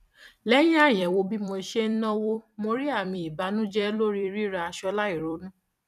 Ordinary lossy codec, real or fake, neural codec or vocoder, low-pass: none; fake; vocoder, 44.1 kHz, 128 mel bands every 256 samples, BigVGAN v2; 14.4 kHz